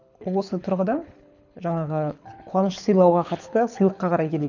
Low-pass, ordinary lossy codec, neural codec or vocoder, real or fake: 7.2 kHz; none; codec, 24 kHz, 6 kbps, HILCodec; fake